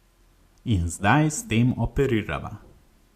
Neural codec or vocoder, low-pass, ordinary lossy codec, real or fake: none; 14.4 kHz; Opus, 64 kbps; real